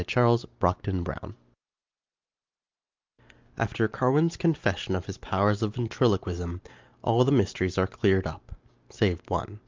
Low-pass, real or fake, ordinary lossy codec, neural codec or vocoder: 7.2 kHz; real; Opus, 24 kbps; none